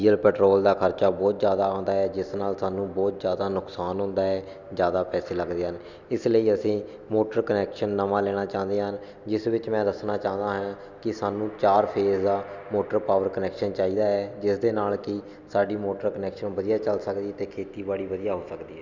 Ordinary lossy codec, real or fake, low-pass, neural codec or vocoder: Opus, 64 kbps; real; 7.2 kHz; none